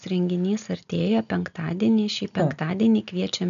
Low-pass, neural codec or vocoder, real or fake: 7.2 kHz; none; real